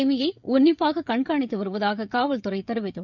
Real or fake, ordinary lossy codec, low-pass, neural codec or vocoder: fake; none; 7.2 kHz; codec, 16 kHz, 16 kbps, FreqCodec, smaller model